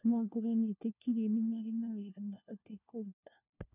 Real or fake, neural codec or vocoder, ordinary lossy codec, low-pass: fake; codec, 16 kHz, 1 kbps, FunCodec, trained on LibriTTS, 50 frames a second; none; 3.6 kHz